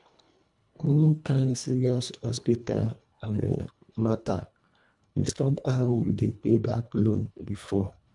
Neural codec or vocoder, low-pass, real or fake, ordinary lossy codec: codec, 24 kHz, 1.5 kbps, HILCodec; 10.8 kHz; fake; none